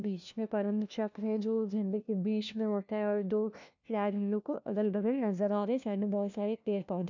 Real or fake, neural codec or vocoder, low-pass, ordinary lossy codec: fake; codec, 16 kHz, 1 kbps, FunCodec, trained on LibriTTS, 50 frames a second; 7.2 kHz; none